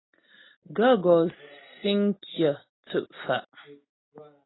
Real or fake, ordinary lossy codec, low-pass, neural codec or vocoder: real; AAC, 16 kbps; 7.2 kHz; none